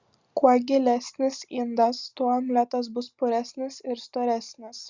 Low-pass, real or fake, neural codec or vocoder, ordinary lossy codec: 7.2 kHz; real; none; Opus, 64 kbps